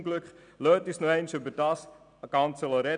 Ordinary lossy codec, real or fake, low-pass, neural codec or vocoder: none; real; 9.9 kHz; none